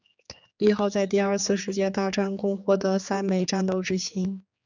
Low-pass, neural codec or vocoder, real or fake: 7.2 kHz; codec, 16 kHz, 4 kbps, X-Codec, HuBERT features, trained on general audio; fake